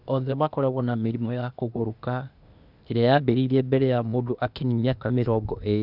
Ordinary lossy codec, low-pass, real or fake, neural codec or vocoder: none; 5.4 kHz; fake; codec, 16 kHz, 0.8 kbps, ZipCodec